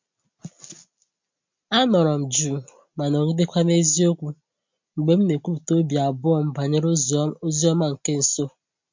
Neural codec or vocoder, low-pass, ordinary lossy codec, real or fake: none; 7.2 kHz; MP3, 48 kbps; real